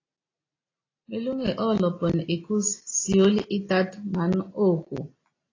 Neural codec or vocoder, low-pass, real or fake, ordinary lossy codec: none; 7.2 kHz; real; AAC, 32 kbps